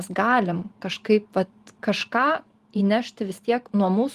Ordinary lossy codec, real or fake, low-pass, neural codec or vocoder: Opus, 24 kbps; fake; 14.4 kHz; vocoder, 44.1 kHz, 128 mel bands every 512 samples, BigVGAN v2